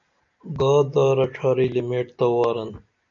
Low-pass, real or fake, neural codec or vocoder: 7.2 kHz; real; none